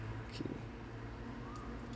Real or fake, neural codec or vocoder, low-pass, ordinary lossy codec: real; none; none; none